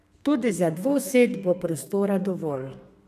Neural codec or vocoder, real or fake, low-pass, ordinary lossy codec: codec, 32 kHz, 1.9 kbps, SNAC; fake; 14.4 kHz; AAC, 96 kbps